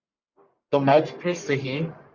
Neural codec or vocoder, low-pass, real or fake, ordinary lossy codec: codec, 44.1 kHz, 1.7 kbps, Pupu-Codec; 7.2 kHz; fake; Opus, 64 kbps